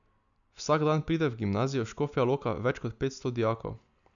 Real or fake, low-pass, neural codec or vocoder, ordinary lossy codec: real; 7.2 kHz; none; none